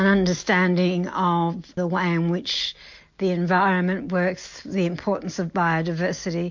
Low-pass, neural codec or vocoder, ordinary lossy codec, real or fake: 7.2 kHz; none; MP3, 48 kbps; real